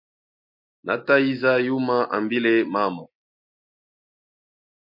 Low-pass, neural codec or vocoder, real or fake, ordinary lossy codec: 5.4 kHz; none; real; MP3, 32 kbps